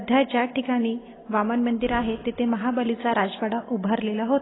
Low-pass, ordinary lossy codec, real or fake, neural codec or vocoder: 7.2 kHz; AAC, 16 kbps; real; none